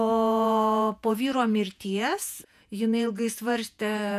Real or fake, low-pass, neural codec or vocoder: fake; 14.4 kHz; vocoder, 48 kHz, 128 mel bands, Vocos